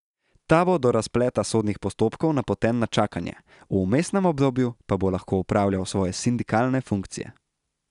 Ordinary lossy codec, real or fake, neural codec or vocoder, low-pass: none; real; none; 10.8 kHz